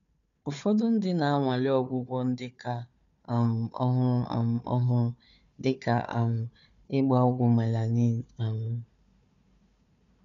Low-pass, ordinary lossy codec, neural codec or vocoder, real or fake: 7.2 kHz; MP3, 96 kbps; codec, 16 kHz, 4 kbps, FunCodec, trained on Chinese and English, 50 frames a second; fake